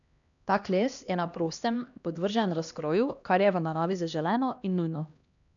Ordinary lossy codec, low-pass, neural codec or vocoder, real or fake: none; 7.2 kHz; codec, 16 kHz, 1 kbps, X-Codec, HuBERT features, trained on LibriSpeech; fake